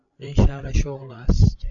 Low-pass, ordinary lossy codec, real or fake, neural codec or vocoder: 7.2 kHz; MP3, 64 kbps; fake; codec, 16 kHz, 16 kbps, FreqCodec, larger model